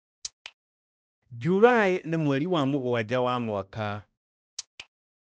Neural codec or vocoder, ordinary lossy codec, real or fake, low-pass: codec, 16 kHz, 1 kbps, X-Codec, HuBERT features, trained on balanced general audio; none; fake; none